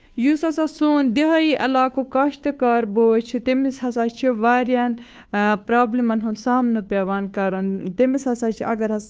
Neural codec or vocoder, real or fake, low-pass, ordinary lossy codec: codec, 16 kHz, 2 kbps, FunCodec, trained on Chinese and English, 25 frames a second; fake; none; none